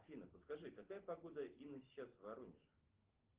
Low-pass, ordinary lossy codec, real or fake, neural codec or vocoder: 3.6 kHz; Opus, 16 kbps; real; none